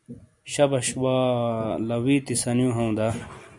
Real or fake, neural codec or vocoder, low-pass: real; none; 10.8 kHz